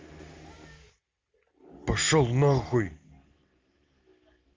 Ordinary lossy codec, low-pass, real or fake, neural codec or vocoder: Opus, 32 kbps; 7.2 kHz; real; none